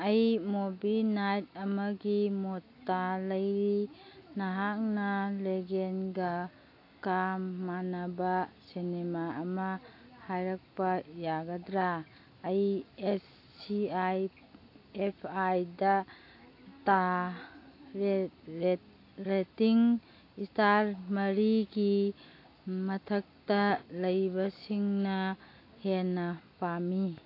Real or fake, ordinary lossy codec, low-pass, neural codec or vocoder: real; AAC, 32 kbps; 5.4 kHz; none